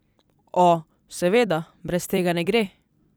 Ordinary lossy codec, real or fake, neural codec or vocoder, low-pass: none; fake; vocoder, 44.1 kHz, 128 mel bands every 256 samples, BigVGAN v2; none